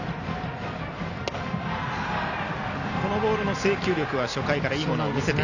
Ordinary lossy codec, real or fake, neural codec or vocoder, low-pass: none; real; none; 7.2 kHz